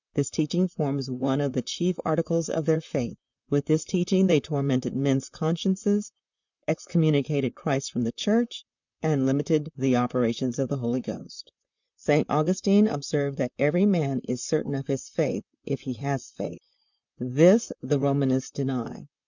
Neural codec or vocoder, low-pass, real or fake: vocoder, 44.1 kHz, 128 mel bands, Pupu-Vocoder; 7.2 kHz; fake